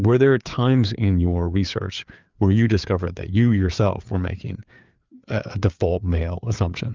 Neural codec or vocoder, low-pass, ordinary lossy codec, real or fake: codec, 16 kHz, 4 kbps, FreqCodec, larger model; 7.2 kHz; Opus, 24 kbps; fake